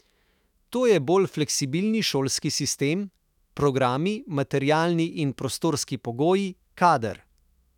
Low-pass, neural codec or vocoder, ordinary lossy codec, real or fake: 19.8 kHz; autoencoder, 48 kHz, 128 numbers a frame, DAC-VAE, trained on Japanese speech; none; fake